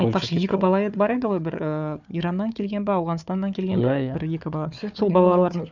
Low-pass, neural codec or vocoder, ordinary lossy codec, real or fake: 7.2 kHz; codec, 16 kHz, 4 kbps, FunCodec, trained on Chinese and English, 50 frames a second; none; fake